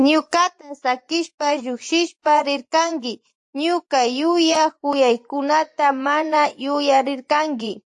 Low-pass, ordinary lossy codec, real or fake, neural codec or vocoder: 10.8 kHz; AAC, 64 kbps; fake; vocoder, 24 kHz, 100 mel bands, Vocos